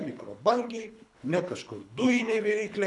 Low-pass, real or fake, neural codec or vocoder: 10.8 kHz; fake; codec, 24 kHz, 3 kbps, HILCodec